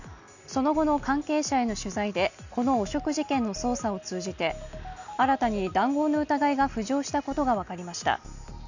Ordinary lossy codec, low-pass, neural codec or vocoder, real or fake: none; 7.2 kHz; none; real